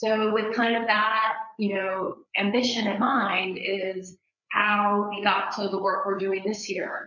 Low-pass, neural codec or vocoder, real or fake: 7.2 kHz; codec, 16 kHz, 4 kbps, FreqCodec, larger model; fake